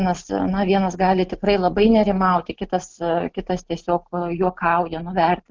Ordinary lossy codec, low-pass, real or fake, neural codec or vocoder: Opus, 32 kbps; 7.2 kHz; real; none